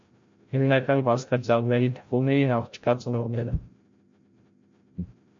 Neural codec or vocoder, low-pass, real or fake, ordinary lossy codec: codec, 16 kHz, 0.5 kbps, FreqCodec, larger model; 7.2 kHz; fake; MP3, 48 kbps